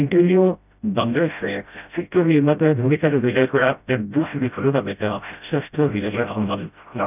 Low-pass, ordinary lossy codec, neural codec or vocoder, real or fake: 3.6 kHz; none; codec, 16 kHz, 0.5 kbps, FreqCodec, smaller model; fake